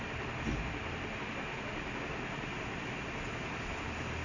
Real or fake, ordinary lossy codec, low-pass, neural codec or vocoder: real; Opus, 64 kbps; 7.2 kHz; none